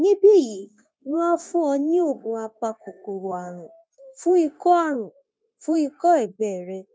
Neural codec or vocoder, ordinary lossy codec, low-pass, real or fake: codec, 16 kHz, 0.9 kbps, LongCat-Audio-Codec; none; none; fake